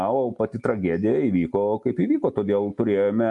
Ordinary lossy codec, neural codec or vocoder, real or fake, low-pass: MP3, 64 kbps; none; real; 10.8 kHz